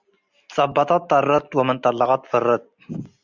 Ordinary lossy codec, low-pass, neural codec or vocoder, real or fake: Opus, 64 kbps; 7.2 kHz; none; real